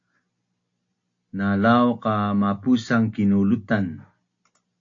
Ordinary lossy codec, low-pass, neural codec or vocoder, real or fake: AAC, 48 kbps; 7.2 kHz; none; real